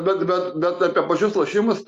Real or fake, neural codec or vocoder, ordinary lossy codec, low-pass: real; none; Opus, 64 kbps; 14.4 kHz